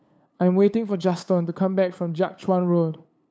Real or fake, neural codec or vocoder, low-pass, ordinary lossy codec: fake; codec, 16 kHz, 8 kbps, FunCodec, trained on LibriTTS, 25 frames a second; none; none